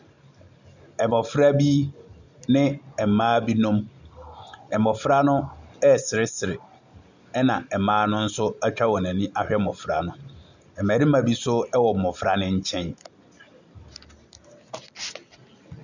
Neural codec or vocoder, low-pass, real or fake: none; 7.2 kHz; real